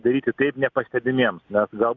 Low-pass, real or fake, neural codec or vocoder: 7.2 kHz; real; none